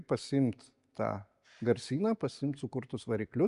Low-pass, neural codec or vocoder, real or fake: 10.8 kHz; none; real